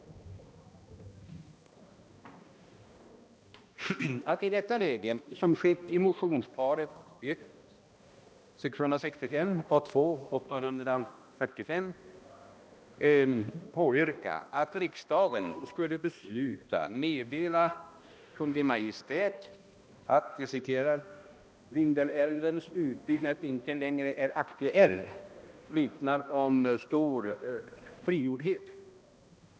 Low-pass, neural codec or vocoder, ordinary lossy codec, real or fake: none; codec, 16 kHz, 1 kbps, X-Codec, HuBERT features, trained on balanced general audio; none; fake